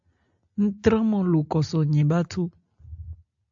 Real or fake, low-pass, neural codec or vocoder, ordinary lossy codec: real; 7.2 kHz; none; AAC, 64 kbps